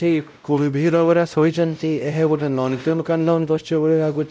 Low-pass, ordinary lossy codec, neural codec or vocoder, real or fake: none; none; codec, 16 kHz, 0.5 kbps, X-Codec, WavLM features, trained on Multilingual LibriSpeech; fake